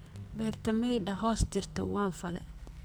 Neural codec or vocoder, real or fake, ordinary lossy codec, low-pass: codec, 44.1 kHz, 2.6 kbps, SNAC; fake; none; none